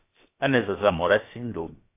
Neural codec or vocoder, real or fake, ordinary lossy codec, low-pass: codec, 16 kHz, about 1 kbps, DyCAST, with the encoder's durations; fake; AAC, 24 kbps; 3.6 kHz